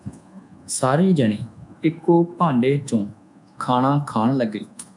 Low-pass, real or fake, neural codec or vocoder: 10.8 kHz; fake; codec, 24 kHz, 1.2 kbps, DualCodec